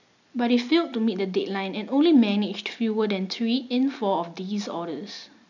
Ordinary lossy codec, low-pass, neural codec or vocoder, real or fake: none; 7.2 kHz; none; real